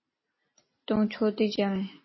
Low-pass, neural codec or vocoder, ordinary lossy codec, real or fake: 7.2 kHz; none; MP3, 24 kbps; real